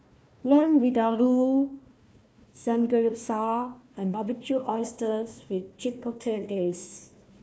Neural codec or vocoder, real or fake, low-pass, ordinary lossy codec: codec, 16 kHz, 1 kbps, FunCodec, trained on Chinese and English, 50 frames a second; fake; none; none